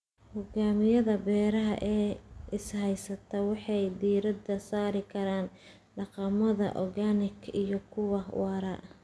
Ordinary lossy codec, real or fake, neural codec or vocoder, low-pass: none; real; none; none